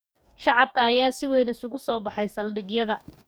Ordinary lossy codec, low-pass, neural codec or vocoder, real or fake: none; none; codec, 44.1 kHz, 2.6 kbps, DAC; fake